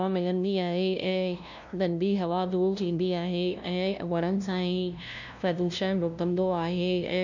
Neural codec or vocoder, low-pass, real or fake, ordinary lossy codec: codec, 16 kHz, 0.5 kbps, FunCodec, trained on LibriTTS, 25 frames a second; 7.2 kHz; fake; none